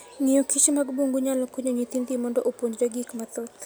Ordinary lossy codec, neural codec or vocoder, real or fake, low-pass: none; none; real; none